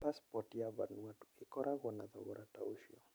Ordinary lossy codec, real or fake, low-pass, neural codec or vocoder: none; real; none; none